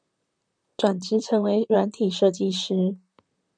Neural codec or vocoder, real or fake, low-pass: vocoder, 44.1 kHz, 128 mel bands, Pupu-Vocoder; fake; 9.9 kHz